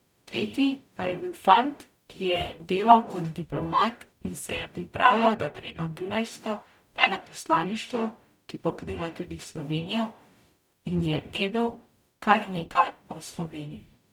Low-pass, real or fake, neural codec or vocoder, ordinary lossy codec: 19.8 kHz; fake; codec, 44.1 kHz, 0.9 kbps, DAC; none